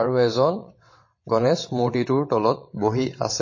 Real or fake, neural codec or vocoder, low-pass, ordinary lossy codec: fake; vocoder, 22.05 kHz, 80 mel bands, WaveNeXt; 7.2 kHz; MP3, 32 kbps